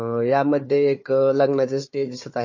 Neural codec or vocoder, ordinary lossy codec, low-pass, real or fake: codec, 16 kHz, 4 kbps, FunCodec, trained on Chinese and English, 50 frames a second; MP3, 32 kbps; 7.2 kHz; fake